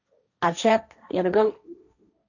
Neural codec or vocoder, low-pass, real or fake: codec, 16 kHz, 1.1 kbps, Voila-Tokenizer; 7.2 kHz; fake